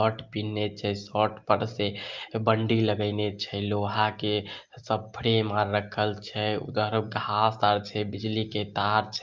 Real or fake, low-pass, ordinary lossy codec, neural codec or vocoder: real; 7.2 kHz; Opus, 24 kbps; none